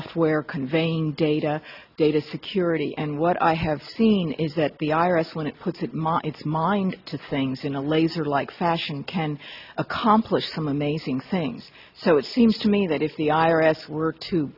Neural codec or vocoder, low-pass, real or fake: none; 5.4 kHz; real